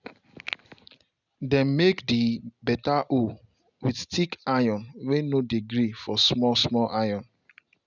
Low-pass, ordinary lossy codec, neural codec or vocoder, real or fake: 7.2 kHz; none; none; real